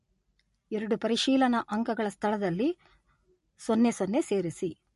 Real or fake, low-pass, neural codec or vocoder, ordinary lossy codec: real; 14.4 kHz; none; MP3, 48 kbps